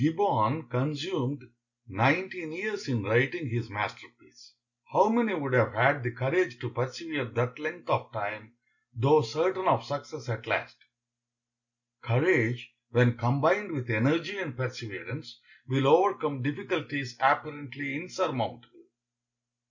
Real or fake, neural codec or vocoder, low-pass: real; none; 7.2 kHz